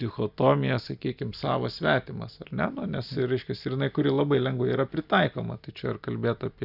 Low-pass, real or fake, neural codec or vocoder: 5.4 kHz; real; none